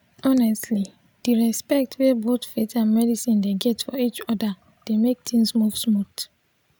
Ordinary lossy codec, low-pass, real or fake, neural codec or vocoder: none; none; real; none